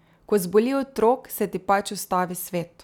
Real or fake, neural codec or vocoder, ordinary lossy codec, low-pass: real; none; none; 19.8 kHz